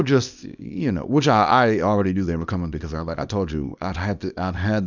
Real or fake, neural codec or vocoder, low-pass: fake; codec, 24 kHz, 0.9 kbps, WavTokenizer, small release; 7.2 kHz